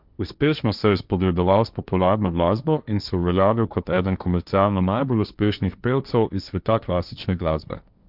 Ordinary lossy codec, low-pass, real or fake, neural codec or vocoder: none; 5.4 kHz; fake; codec, 16 kHz, 1.1 kbps, Voila-Tokenizer